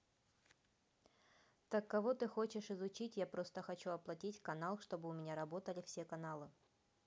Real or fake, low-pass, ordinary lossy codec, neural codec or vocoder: real; none; none; none